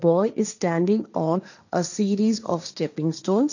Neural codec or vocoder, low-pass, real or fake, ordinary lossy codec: codec, 16 kHz, 1.1 kbps, Voila-Tokenizer; 7.2 kHz; fake; none